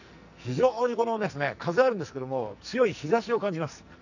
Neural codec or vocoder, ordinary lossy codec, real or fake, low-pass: codec, 44.1 kHz, 2.6 kbps, SNAC; none; fake; 7.2 kHz